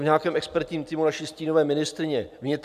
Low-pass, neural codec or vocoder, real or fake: 14.4 kHz; none; real